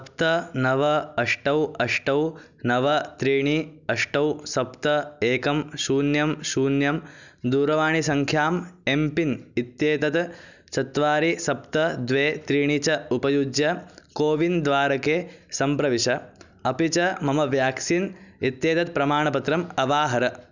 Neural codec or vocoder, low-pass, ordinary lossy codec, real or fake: none; 7.2 kHz; none; real